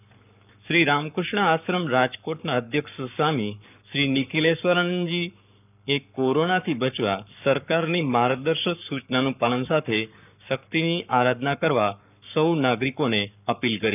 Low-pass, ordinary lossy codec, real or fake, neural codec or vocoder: 3.6 kHz; none; fake; codec, 44.1 kHz, 7.8 kbps, Pupu-Codec